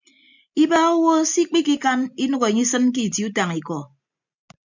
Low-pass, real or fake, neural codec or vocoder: 7.2 kHz; real; none